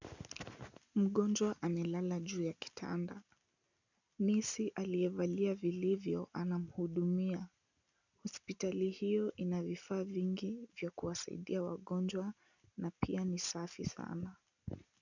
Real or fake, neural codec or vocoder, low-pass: real; none; 7.2 kHz